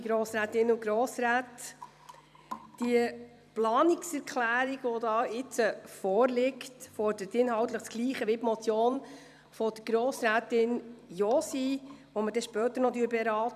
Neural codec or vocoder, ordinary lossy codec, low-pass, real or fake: vocoder, 44.1 kHz, 128 mel bands every 256 samples, BigVGAN v2; none; 14.4 kHz; fake